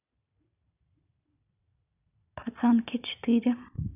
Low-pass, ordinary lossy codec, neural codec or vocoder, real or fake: 3.6 kHz; none; vocoder, 44.1 kHz, 128 mel bands every 256 samples, BigVGAN v2; fake